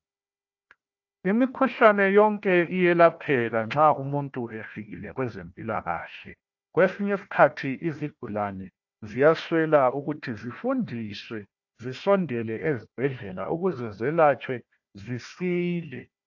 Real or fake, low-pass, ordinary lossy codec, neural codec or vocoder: fake; 7.2 kHz; AAC, 48 kbps; codec, 16 kHz, 1 kbps, FunCodec, trained on Chinese and English, 50 frames a second